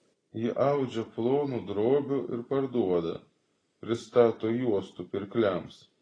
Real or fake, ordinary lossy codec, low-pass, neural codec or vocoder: fake; AAC, 32 kbps; 9.9 kHz; vocoder, 44.1 kHz, 128 mel bands every 256 samples, BigVGAN v2